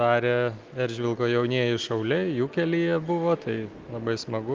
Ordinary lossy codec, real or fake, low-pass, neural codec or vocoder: Opus, 32 kbps; real; 7.2 kHz; none